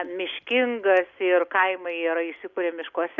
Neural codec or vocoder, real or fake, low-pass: none; real; 7.2 kHz